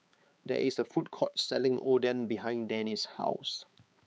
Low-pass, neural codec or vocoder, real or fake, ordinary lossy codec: none; codec, 16 kHz, 4 kbps, X-Codec, HuBERT features, trained on balanced general audio; fake; none